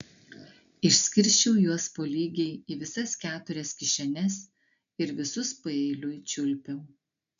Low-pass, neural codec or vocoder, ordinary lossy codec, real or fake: 7.2 kHz; none; MP3, 96 kbps; real